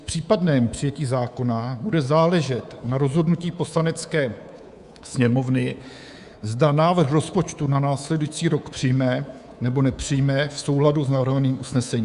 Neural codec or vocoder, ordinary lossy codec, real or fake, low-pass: codec, 24 kHz, 3.1 kbps, DualCodec; Opus, 64 kbps; fake; 10.8 kHz